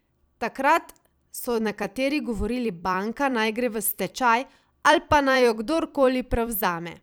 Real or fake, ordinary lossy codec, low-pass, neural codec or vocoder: fake; none; none; vocoder, 44.1 kHz, 128 mel bands every 256 samples, BigVGAN v2